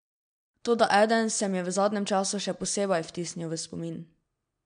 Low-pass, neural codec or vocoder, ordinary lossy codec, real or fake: 9.9 kHz; none; MP3, 64 kbps; real